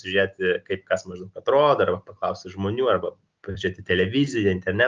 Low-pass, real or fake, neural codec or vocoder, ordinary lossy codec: 7.2 kHz; real; none; Opus, 24 kbps